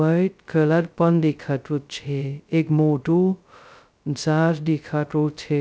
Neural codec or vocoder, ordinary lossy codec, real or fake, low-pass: codec, 16 kHz, 0.2 kbps, FocalCodec; none; fake; none